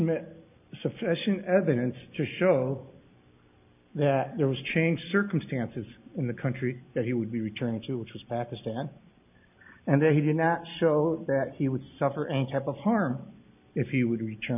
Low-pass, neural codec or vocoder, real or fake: 3.6 kHz; none; real